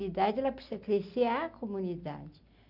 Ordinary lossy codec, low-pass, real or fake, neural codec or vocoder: none; 5.4 kHz; real; none